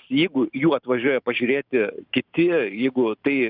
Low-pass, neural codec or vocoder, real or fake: 5.4 kHz; vocoder, 44.1 kHz, 128 mel bands every 512 samples, BigVGAN v2; fake